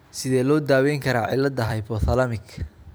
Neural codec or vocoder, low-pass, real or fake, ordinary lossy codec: vocoder, 44.1 kHz, 128 mel bands every 256 samples, BigVGAN v2; none; fake; none